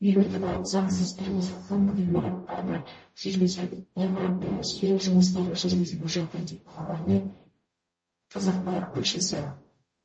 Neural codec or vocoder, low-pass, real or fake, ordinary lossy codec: codec, 44.1 kHz, 0.9 kbps, DAC; 9.9 kHz; fake; MP3, 32 kbps